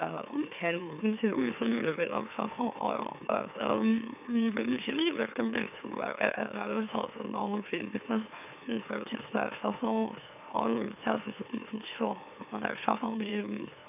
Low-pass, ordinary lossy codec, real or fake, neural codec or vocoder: 3.6 kHz; none; fake; autoencoder, 44.1 kHz, a latent of 192 numbers a frame, MeloTTS